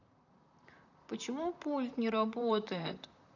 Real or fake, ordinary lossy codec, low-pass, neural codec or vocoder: fake; none; 7.2 kHz; vocoder, 44.1 kHz, 128 mel bands, Pupu-Vocoder